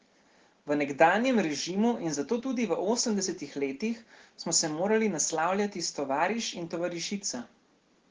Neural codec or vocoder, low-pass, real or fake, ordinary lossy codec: none; 7.2 kHz; real; Opus, 16 kbps